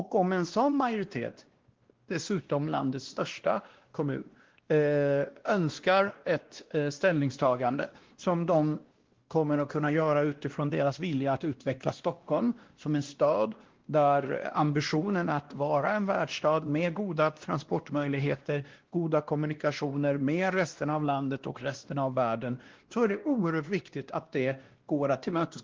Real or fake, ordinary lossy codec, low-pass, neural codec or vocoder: fake; Opus, 16 kbps; 7.2 kHz; codec, 16 kHz, 1 kbps, X-Codec, WavLM features, trained on Multilingual LibriSpeech